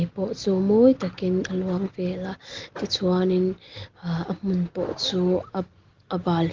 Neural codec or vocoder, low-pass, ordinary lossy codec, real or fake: none; 7.2 kHz; Opus, 16 kbps; real